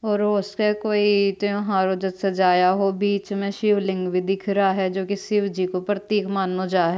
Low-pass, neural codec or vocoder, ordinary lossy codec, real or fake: none; none; none; real